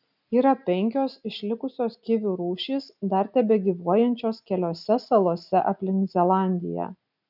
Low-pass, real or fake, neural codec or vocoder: 5.4 kHz; real; none